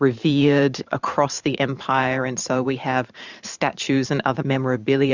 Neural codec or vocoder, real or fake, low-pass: vocoder, 44.1 kHz, 128 mel bands every 512 samples, BigVGAN v2; fake; 7.2 kHz